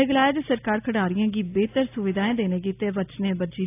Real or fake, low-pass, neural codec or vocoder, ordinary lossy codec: real; 3.6 kHz; none; AAC, 24 kbps